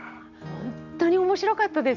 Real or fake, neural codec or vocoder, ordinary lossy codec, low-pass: real; none; none; 7.2 kHz